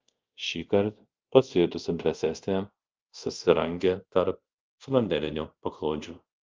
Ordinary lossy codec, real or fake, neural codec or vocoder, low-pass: Opus, 32 kbps; fake; codec, 24 kHz, 0.5 kbps, DualCodec; 7.2 kHz